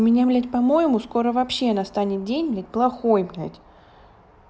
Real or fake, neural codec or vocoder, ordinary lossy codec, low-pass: real; none; none; none